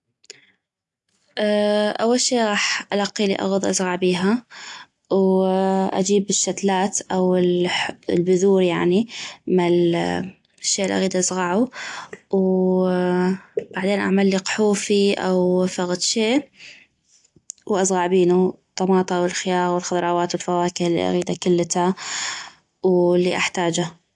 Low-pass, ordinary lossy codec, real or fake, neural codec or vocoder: 10.8 kHz; AAC, 64 kbps; real; none